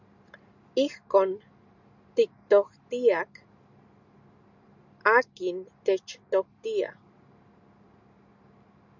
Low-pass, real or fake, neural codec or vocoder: 7.2 kHz; real; none